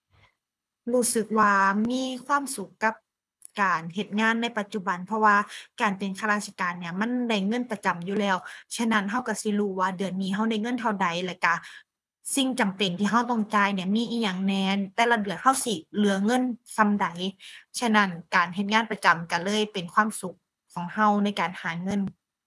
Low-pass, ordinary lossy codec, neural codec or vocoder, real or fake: none; none; codec, 24 kHz, 6 kbps, HILCodec; fake